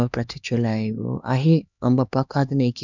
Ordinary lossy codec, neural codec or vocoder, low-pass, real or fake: none; codec, 16 kHz, 2 kbps, X-Codec, HuBERT features, trained on LibriSpeech; 7.2 kHz; fake